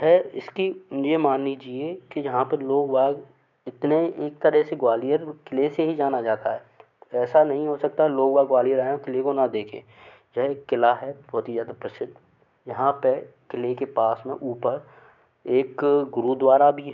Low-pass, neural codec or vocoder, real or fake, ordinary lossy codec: 7.2 kHz; codec, 16 kHz, 6 kbps, DAC; fake; none